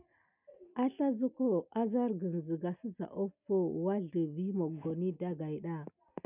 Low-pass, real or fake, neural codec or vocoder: 3.6 kHz; real; none